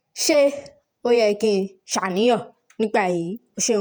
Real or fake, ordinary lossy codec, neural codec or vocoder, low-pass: fake; none; vocoder, 48 kHz, 128 mel bands, Vocos; none